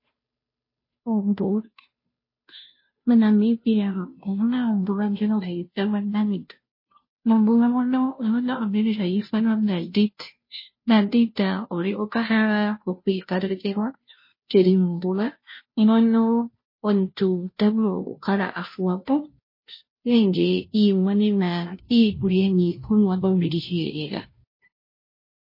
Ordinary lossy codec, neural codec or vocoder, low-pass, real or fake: MP3, 24 kbps; codec, 16 kHz, 0.5 kbps, FunCodec, trained on Chinese and English, 25 frames a second; 5.4 kHz; fake